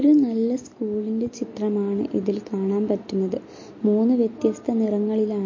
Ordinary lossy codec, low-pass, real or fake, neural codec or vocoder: MP3, 32 kbps; 7.2 kHz; real; none